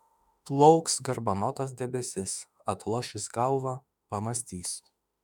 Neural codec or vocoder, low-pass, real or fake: autoencoder, 48 kHz, 32 numbers a frame, DAC-VAE, trained on Japanese speech; 19.8 kHz; fake